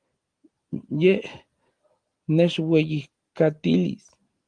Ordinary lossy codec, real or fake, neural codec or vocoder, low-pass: Opus, 24 kbps; real; none; 9.9 kHz